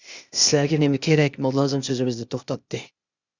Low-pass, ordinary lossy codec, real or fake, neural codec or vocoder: 7.2 kHz; Opus, 64 kbps; fake; codec, 16 kHz, 0.8 kbps, ZipCodec